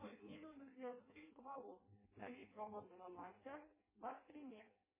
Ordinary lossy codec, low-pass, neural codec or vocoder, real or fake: MP3, 16 kbps; 3.6 kHz; codec, 16 kHz in and 24 kHz out, 0.6 kbps, FireRedTTS-2 codec; fake